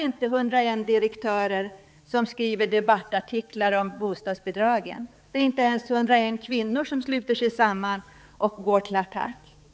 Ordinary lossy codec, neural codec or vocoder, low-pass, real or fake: none; codec, 16 kHz, 4 kbps, X-Codec, HuBERT features, trained on balanced general audio; none; fake